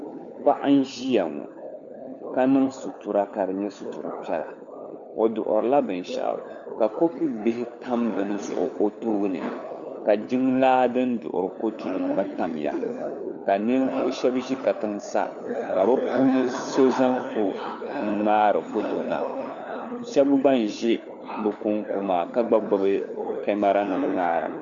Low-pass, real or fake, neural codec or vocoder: 7.2 kHz; fake; codec, 16 kHz, 4 kbps, FunCodec, trained on LibriTTS, 50 frames a second